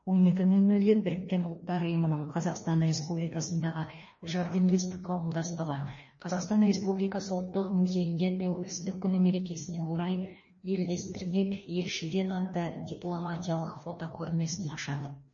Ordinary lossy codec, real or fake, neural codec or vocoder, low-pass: MP3, 32 kbps; fake; codec, 16 kHz, 1 kbps, FreqCodec, larger model; 7.2 kHz